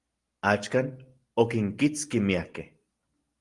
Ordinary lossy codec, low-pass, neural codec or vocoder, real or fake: Opus, 24 kbps; 10.8 kHz; none; real